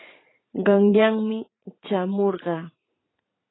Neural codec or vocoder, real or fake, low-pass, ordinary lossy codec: vocoder, 44.1 kHz, 80 mel bands, Vocos; fake; 7.2 kHz; AAC, 16 kbps